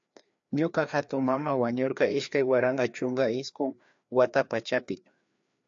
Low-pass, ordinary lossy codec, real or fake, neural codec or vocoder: 7.2 kHz; AAC, 64 kbps; fake; codec, 16 kHz, 2 kbps, FreqCodec, larger model